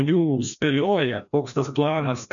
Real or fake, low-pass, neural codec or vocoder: fake; 7.2 kHz; codec, 16 kHz, 1 kbps, FreqCodec, larger model